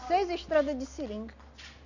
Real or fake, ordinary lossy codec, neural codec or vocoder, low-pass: real; none; none; 7.2 kHz